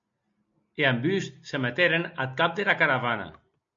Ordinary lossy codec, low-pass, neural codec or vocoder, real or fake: MP3, 48 kbps; 7.2 kHz; none; real